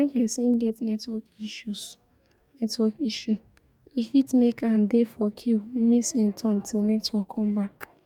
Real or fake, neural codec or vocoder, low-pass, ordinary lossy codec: fake; codec, 44.1 kHz, 2.6 kbps, DAC; 19.8 kHz; none